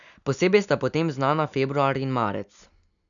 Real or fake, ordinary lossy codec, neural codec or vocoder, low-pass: real; none; none; 7.2 kHz